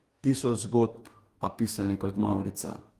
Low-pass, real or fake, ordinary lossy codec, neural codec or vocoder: 14.4 kHz; fake; Opus, 32 kbps; codec, 44.1 kHz, 2.6 kbps, DAC